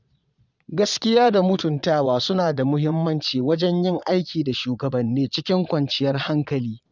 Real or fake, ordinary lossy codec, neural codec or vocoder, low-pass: fake; none; vocoder, 22.05 kHz, 80 mel bands, Vocos; 7.2 kHz